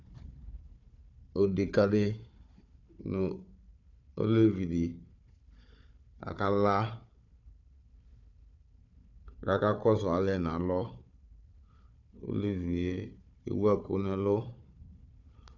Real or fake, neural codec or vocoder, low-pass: fake; codec, 16 kHz, 4 kbps, FunCodec, trained on Chinese and English, 50 frames a second; 7.2 kHz